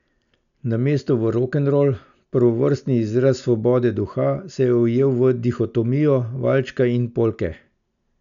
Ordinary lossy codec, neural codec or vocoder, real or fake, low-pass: none; none; real; 7.2 kHz